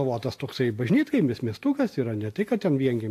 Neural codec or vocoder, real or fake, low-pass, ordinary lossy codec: none; real; 14.4 kHz; AAC, 64 kbps